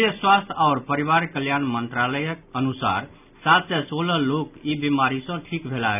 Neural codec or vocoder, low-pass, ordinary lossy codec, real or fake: none; 3.6 kHz; none; real